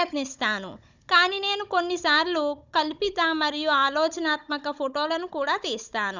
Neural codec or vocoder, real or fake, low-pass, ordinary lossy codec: codec, 16 kHz, 16 kbps, FunCodec, trained on Chinese and English, 50 frames a second; fake; 7.2 kHz; none